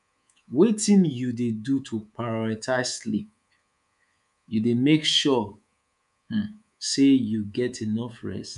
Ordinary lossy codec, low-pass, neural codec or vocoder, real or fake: none; 10.8 kHz; codec, 24 kHz, 3.1 kbps, DualCodec; fake